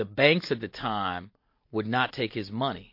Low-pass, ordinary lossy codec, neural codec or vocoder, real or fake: 5.4 kHz; MP3, 32 kbps; none; real